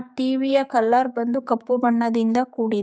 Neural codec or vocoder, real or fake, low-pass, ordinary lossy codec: codec, 16 kHz, 4 kbps, X-Codec, HuBERT features, trained on general audio; fake; none; none